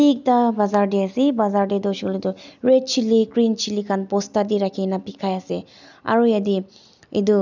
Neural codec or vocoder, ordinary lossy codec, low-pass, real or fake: none; none; 7.2 kHz; real